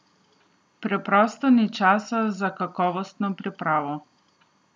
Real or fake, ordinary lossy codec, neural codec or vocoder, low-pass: real; none; none; none